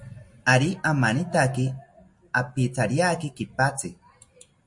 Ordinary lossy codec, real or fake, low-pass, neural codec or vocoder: MP3, 48 kbps; real; 10.8 kHz; none